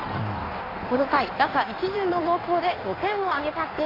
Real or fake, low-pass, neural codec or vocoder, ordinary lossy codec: fake; 5.4 kHz; codec, 16 kHz in and 24 kHz out, 1.1 kbps, FireRedTTS-2 codec; AAC, 24 kbps